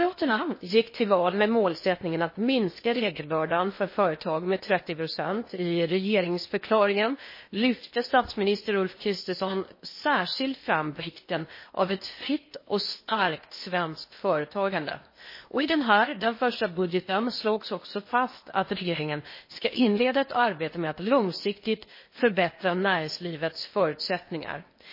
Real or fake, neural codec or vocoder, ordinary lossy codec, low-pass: fake; codec, 16 kHz in and 24 kHz out, 0.8 kbps, FocalCodec, streaming, 65536 codes; MP3, 24 kbps; 5.4 kHz